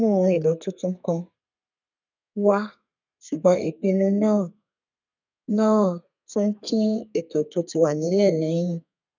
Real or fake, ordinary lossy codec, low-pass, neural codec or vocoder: fake; none; 7.2 kHz; codec, 32 kHz, 1.9 kbps, SNAC